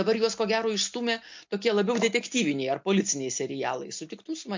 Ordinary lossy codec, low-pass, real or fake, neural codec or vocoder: MP3, 48 kbps; 7.2 kHz; real; none